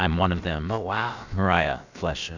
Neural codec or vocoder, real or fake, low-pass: codec, 16 kHz, about 1 kbps, DyCAST, with the encoder's durations; fake; 7.2 kHz